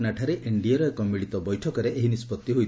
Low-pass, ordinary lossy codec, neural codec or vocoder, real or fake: none; none; none; real